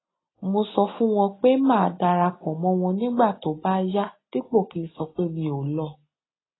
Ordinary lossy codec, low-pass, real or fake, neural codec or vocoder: AAC, 16 kbps; 7.2 kHz; real; none